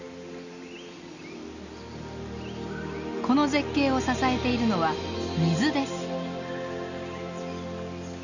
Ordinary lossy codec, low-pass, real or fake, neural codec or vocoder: none; 7.2 kHz; real; none